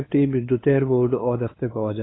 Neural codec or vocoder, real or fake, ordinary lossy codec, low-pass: codec, 16 kHz, 2 kbps, FunCodec, trained on LibriTTS, 25 frames a second; fake; AAC, 16 kbps; 7.2 kHz